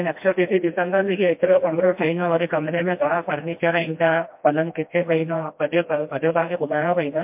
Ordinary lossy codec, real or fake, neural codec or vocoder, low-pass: MP3, 32 kbps; fake; codec, 16 kHz, 1 kbps, FreqCodec, smaller model; 3.6 kHz